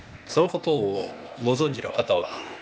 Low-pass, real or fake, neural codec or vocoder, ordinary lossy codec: none; fake; codec, 16 kHz, 0.8 kbps, ZipCodec; none